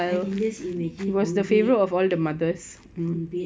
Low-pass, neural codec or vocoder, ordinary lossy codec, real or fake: none; none; none; real